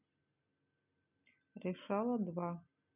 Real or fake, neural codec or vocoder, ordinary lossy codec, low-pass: real; none; none; 3.6 kHz